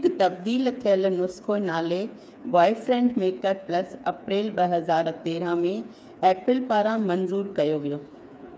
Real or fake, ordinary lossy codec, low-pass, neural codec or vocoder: fake; none; none; codec, 16 kHz, 4 kbps, FreqCodec, smaller model